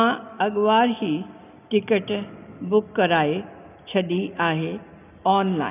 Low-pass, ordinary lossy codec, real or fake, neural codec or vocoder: 3.6 kHz; none; real; none